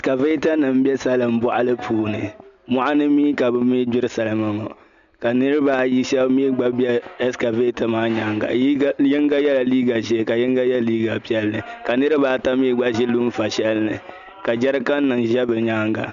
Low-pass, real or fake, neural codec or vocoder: 7.2 kHz; real; none